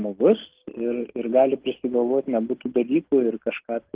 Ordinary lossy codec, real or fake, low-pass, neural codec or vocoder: Opus, 16 kbps; real; 3.6 kHz; none